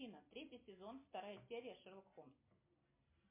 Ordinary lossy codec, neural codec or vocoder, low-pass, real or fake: MP3, 24 kbps; none; 3.6 kHz; real